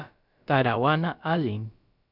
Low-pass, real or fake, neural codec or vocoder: 5.4 kHz; fake; codec, 16 kHz, about 1 kbps, DyCAST, with the encoder's durations